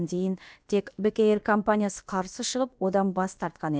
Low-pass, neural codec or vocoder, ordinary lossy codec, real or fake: none; codec, 16 kHz, about 1 kbps, DyCAST, with the encoder's durations; none; fake